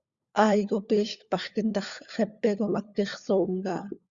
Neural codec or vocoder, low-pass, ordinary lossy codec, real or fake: codec, 16 kHz, 4 kbps, FunCodec, trained on LibriTTS, 50 frames a second; 7.2 kHz; Opus, 64 kbps; fake